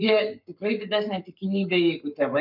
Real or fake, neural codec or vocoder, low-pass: fake; codec, 44.1 kHz, 7.8 kbps, Pupu-Codec; 5.4 kHz